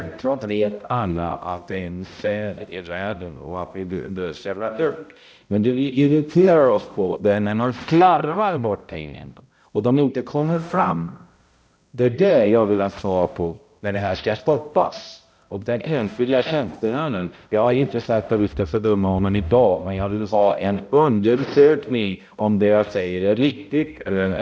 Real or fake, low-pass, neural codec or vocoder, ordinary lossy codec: fake; none; codec, 16 kHz, 0.5 kbps, X-Codec, HuBERT features, trained on balanced general audio; none